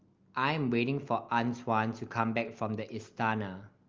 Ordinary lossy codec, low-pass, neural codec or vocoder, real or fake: Opus, 32 kbps; 7.2 kHz; none; real